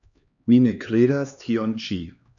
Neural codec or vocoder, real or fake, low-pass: codec, 16 kHz, 2 kbps, X-Codec, HuBERT features, trained on LibriSpeech; fake; 7.2 kHz